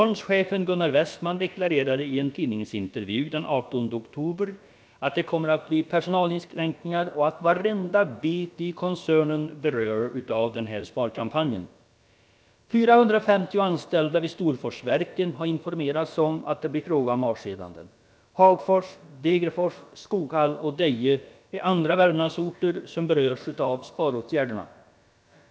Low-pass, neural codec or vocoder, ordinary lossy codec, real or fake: none; codec, 16 kHz, about 1 kbps, DyCAST, with the encoder's durations; none; fake